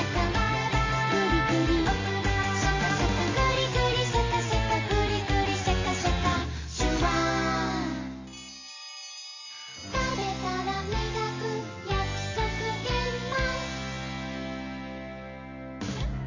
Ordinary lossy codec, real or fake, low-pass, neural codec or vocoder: MP3, 32 kbps; real; 7.2 kHz; none